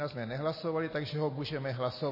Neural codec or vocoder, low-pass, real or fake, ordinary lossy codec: none; 5.4 kHz; real; MP3, 24 kbps